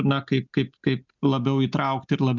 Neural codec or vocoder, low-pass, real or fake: none; 7.2 kHz; real